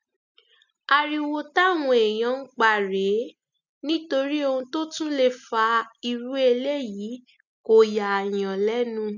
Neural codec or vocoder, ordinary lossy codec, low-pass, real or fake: none; none; 7.2 kHz; real